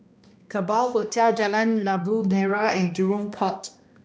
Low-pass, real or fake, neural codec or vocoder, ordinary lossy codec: none; fake; codec, 16 kHz, 1 kbps, X-Codec, HuBERT features, trained on balanced general audio; none